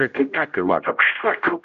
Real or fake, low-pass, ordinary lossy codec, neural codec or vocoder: fake; 7.2 kHz; AAC, 64 kbps; codec, 16 kHz, 0.5 kbps, X-Codec, HuBERT features, trained on general audio